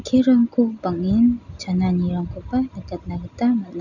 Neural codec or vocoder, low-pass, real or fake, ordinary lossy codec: none; 7.2 kHz; real; none